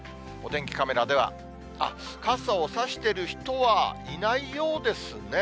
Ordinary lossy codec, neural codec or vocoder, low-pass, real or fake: none; none; none; real